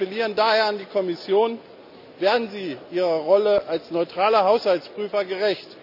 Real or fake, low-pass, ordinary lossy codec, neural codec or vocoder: real; 5.4 kHz; none; none